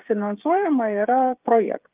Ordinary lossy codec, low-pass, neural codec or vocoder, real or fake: Opus, 32 kbps; 3.6 kHz; codec, 16 kHz, 8 kbps, FreqCodec, smaller model; fake